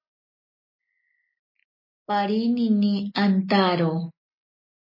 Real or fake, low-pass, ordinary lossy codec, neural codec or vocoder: real; 5.4 kHz; MP3, 24 kbps; none